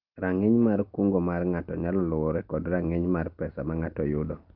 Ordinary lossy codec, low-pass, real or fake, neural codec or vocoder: Opus, 24 kbps; 5.4 kHz; real; none